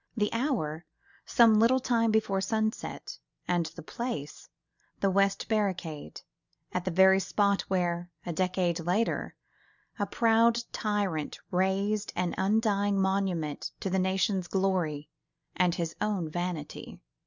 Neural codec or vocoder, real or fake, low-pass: none; real; 7.2 kHz